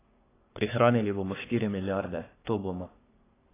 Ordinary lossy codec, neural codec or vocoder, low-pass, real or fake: AAC, 16 kbps; codec, 44.1 kHz, 3.4 kbps, Pupu-Codec; 3.6 kHz; fake